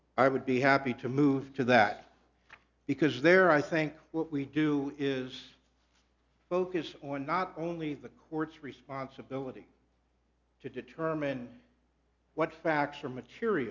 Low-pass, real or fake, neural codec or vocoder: 7.2 kHz; real; none